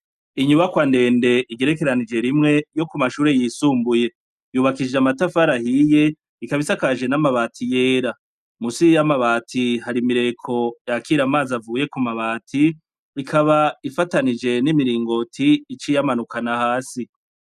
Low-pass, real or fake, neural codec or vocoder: 14.4 kHz; real; none